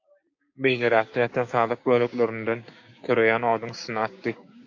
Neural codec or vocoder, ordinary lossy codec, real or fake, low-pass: codec, 16 kHz, 6 kbps, DAC; AAC, 48 kbps; fake; 7.2 kHz